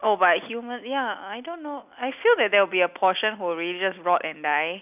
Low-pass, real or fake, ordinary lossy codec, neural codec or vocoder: 3.6 kHz; real; none; none